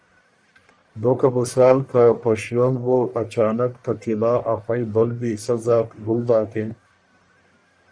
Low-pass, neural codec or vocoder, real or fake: 9.9 kHz; codec, 44.1 kHz, 1.7 kbps, Pupu-Codec; fake